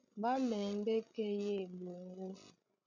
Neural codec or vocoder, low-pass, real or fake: codec, 16 kHz, 16 kbps, FreqCodec, larger model; 7.2 kHz; fake